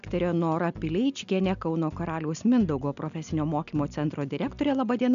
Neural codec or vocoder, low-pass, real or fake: none; 7.2 kHz; real